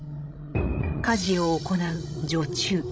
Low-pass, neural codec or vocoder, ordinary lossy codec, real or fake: none; codec, 16 kHz, 16 kbps, FreqCodec, larger model; none; fake